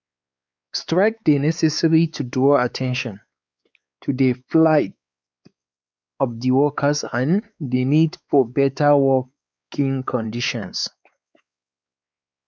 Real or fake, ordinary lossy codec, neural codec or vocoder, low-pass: fake; none; codec, 16 kHz, 4 kbps, X-Codec, WavLM features, trained on Multilingual LibriSpeech; none